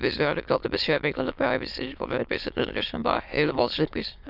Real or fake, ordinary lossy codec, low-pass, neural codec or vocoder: fake; none; 5.4 kHz; autoencoder, 22.05 kHz, a latent of 192 numbers a frame, VITS, trained on many speakers